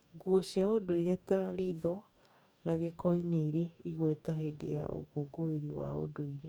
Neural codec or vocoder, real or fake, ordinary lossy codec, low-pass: codec, 44.1 kHz, 2.6 kbps, DAC; fake; none; none